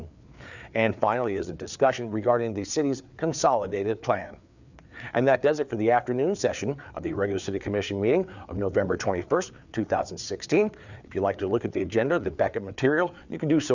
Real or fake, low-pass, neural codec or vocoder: fake; 7.2 kHz; codec, 16 kHz, 4 kbps, FreqCodec, larger model